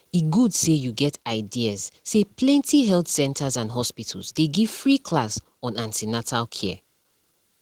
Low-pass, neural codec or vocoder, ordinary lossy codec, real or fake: 19.8 kHz; none; Opus, 16 kbps; real